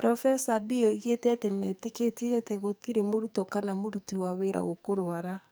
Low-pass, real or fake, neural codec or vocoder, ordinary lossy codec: none; fake; codec, 44.1 kHz, 2.6 kbps, SNAC; none